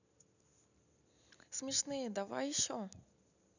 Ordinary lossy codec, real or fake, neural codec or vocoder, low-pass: none; real; none; 7.2 kHz